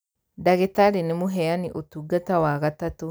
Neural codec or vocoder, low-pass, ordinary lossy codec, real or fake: none; none; none; real